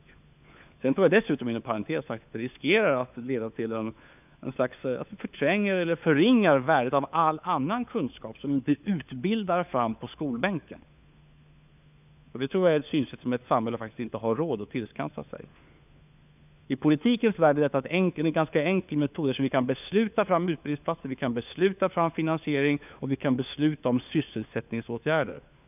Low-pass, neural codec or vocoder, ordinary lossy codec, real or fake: 3.6 kHz; codec, 16 kHz, 4 kbps, FunCodec, trained on LibriTTS, 50 frames a second; none; fake